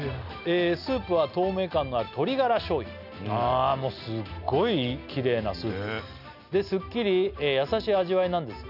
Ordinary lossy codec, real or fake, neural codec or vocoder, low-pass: none; real; none; 5.4 kHz